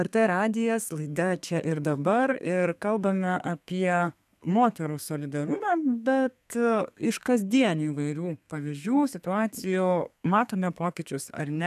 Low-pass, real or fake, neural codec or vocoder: 14.4 kHz; fake; codec, 32 kHz, 1.9 kbps, SNAC